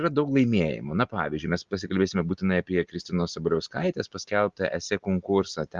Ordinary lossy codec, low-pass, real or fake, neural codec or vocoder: Opus, 32 kbps; 7.2 kHz; real; none